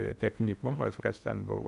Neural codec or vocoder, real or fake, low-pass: codec, 16 kHz in and 24 kHz out, 0.8 kbps, FocalCodec, streaming, 65536 codes; fake; 10.8 kHz